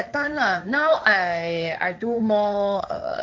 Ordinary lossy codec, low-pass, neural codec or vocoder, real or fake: none; none; codec, 16 kHz, 1.1 kbps, Voila-Tokenizer; fake